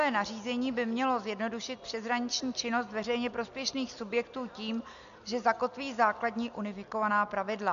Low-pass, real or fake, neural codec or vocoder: 7.2 kHz; real; none